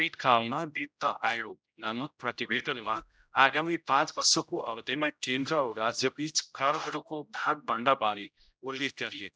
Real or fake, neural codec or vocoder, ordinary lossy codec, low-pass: fake; codec, 16 kHz, 0.5 kbps, X-Codec, HuBERT features, trained on general audio; none; none